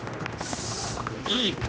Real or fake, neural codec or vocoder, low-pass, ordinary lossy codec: fake; codec, 16 kHz, 1 kbps, X-Codec, HuBERT features, trained on general audio; none; none